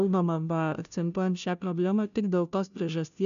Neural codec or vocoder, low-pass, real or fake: codec, 16 kHz, 0.5 kbps, FunCodec, trained on Chinese and English, 25 frames a second; 7.2 kHz; fake